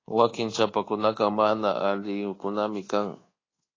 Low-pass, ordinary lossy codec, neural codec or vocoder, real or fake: 7.2 kHz; AAC, 32 kbps; codec, 24 kHz, 1.2 kbps, DualCodec; fake